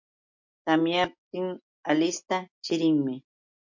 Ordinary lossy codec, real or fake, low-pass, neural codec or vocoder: MP3, 48 kbps; real; 7.2 kHz; none